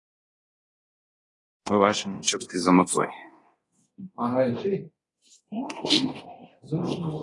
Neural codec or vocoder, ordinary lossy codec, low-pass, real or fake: codec, 24 kHz, 0.9 kbps, DualCodec; AAC, 32 kbps; 10.8 kHz; fake